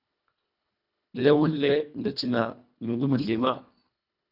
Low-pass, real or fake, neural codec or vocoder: 5.4 kHz; fake; codec, 24 kHz, 1.5 kbps, HILCodec